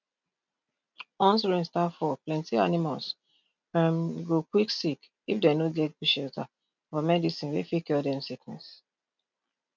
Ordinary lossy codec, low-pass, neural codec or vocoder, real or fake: none; 7.2 kHz; none; real